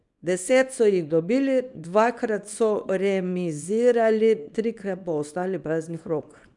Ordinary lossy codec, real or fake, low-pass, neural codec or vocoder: none; fake; 10.8 kHz; codec, 24 kHz, 0.9 kbps, WavTokenizer, small release